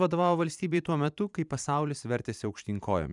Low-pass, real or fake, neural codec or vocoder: 10.8 kHz; fake; vocoder, 44.1 kHz, 128 mel bands every 512 samples, BigVGAN v2